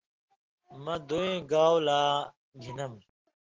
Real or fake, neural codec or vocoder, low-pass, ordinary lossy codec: real; none; 7.2 kHz; Opus, 16 kbps